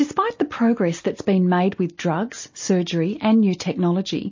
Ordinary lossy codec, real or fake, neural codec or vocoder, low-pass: MP3, 32 kbps; real; none; 7.2 kHz